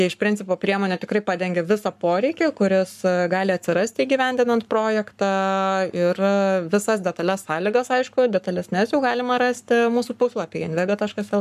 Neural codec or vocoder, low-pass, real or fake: codec, 44.1 kHz, 7.8 kbps, Pupu-Codec; 14.4 kHz; fake